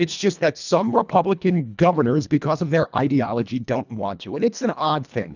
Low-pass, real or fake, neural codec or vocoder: 7.2 kHz; fake; codec, 24 kHz, 1.5 kbps, HILCodec